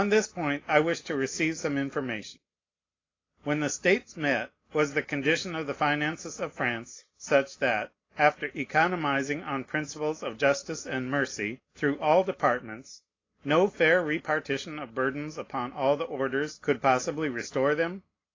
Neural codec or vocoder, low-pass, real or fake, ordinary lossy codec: none; 7.2 kHz; real; AAC, 32 kbps